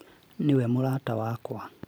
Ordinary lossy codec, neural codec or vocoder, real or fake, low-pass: none; vocoder, 44.1 kHz, 128 mel bands every 512 samples, BigVGAN v2; fake; none